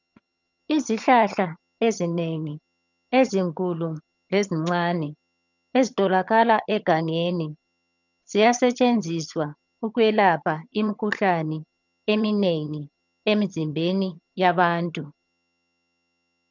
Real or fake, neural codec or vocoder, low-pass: fake; vocoder, 22.05 kHz, 80 mel bands, HiFi-GAN; 7.2 kHz